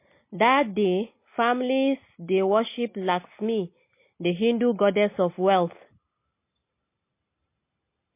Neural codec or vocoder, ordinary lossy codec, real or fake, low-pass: none; MP3, 24 kbps; real; 3.6 kHz